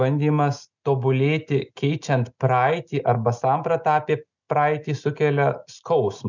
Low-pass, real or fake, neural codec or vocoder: 7.2 kHz; real; none